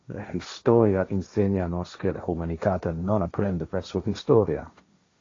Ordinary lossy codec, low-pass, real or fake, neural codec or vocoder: AAC, 32 kbps; 7.2 kHz; fake; codec, 16 kHz, 1.1 kbps, Voila-Tokenizer